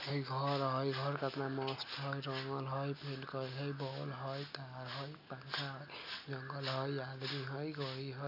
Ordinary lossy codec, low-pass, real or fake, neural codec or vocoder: none; 5.4 kHz; real; none